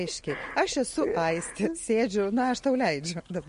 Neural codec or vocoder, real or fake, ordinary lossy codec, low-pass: none; real; MP3, 48 kbps; 10.8 kHz